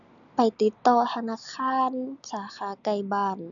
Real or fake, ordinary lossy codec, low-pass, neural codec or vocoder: real; none; 7.2 kHz; none